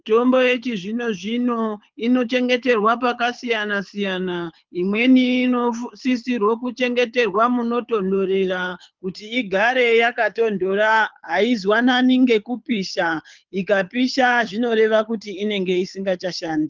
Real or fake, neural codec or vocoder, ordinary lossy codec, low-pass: fake; codec, 24 kHz, 6 kbps, HILCodec; Opus, 24 kbps; 7.2 kHz